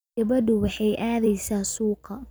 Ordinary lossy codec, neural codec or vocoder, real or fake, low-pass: none; vocoder, 44.1 kHz, 128 mel bands every 256 samples, BigVGAN v2; fake; none